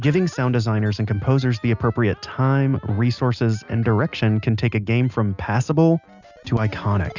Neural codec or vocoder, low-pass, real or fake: none; 7.2 kHz; real